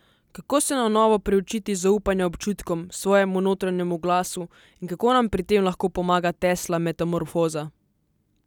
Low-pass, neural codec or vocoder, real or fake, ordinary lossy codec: 19.8 kHz; none; real; none